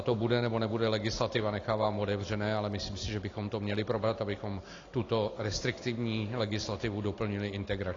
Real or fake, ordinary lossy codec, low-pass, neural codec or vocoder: real; AAC, 32 kbps; 7.2 kHz; none